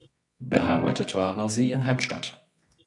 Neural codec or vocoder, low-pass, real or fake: codec, 24 kHz, 0.9 kbps, WavTokenizer, medium music audio release; 10.8 kHz; fake